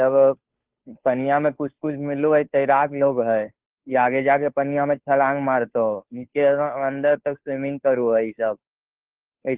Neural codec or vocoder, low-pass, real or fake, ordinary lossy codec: codec, 16 kHz, 2 kbps, FunCodec, trained on Chinese and English, 25 frames a second; 3.6 kHz; fake; Opus, 16 kbps